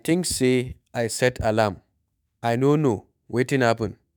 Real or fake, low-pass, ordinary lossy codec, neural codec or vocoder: fake; none; none; autoencoder, 48 kHz, 128 numbers a frame, DAC-VAE, trained on Japanese speech